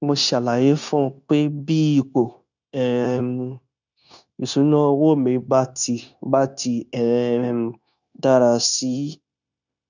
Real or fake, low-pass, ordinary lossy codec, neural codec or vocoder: fake; 7.2 kHz; none; codec, 16 kHz, 0.9 kbps, LongCat-Audio-Codec